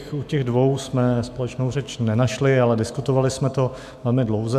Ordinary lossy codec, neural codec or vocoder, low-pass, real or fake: MP3, 96 kbps; autoencoder, 48 kHz, 128 numbers a frame, DAC-VAE, trained on Japanese speech; 14.4 kHz; fake